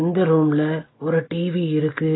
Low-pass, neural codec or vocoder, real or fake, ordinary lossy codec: 7.2 kHz; none; real; AAC, 16 kbps